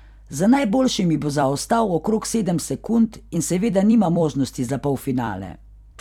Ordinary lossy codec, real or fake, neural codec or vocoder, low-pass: none; fake; vocoder, 44.1 kHz, 128 mel bands every 256 samples, BigVGAN v2; 19.8 kHz